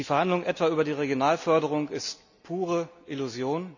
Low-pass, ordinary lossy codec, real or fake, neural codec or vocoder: 7.2 kHz; none; real; none